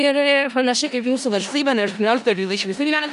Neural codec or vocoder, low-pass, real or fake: codec, 16 kHz in and 24 kHz out, 0.4 kbps, LongCat-Audio-Codec, four codebook decoder; 10.8 kHz; fake